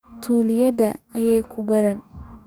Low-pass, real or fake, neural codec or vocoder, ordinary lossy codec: none; fake; codec, 44.1 kHz, 2.6 kbps, DAC; none